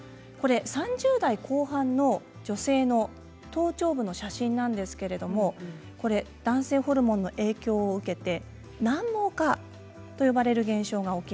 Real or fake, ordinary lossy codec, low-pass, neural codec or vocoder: real; none; none; none